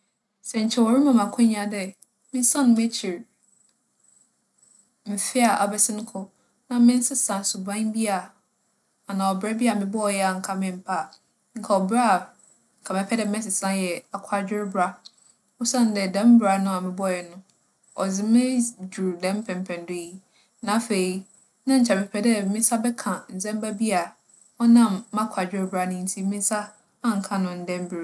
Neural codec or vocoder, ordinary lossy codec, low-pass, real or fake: none; none; none; real